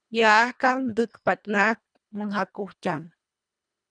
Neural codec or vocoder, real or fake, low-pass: codec, 24 kHz, 1.5 kbps, HILCodec; fake; 9.9 kHz